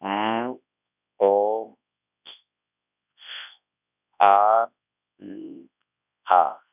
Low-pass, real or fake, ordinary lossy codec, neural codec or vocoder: 3.6 kHz; fake; none; codec, 24 kHz, 0.9 kbps, WavTokenizer, large speech release